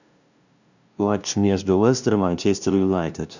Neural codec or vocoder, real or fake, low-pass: codec, 16 kHz, 0.5 kbps, FunCodec, trained on LibriTTS, 25 frames a second; fake; 7.2 kHz